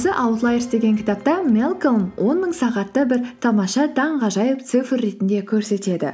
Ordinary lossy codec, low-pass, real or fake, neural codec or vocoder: none; none; real; none